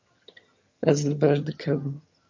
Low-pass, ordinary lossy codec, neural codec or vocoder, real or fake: 7.2 kHz; MP3, 48 kbps; vocoder, 22.05 kHz, 80 mel bands, HiFi-GAN; fake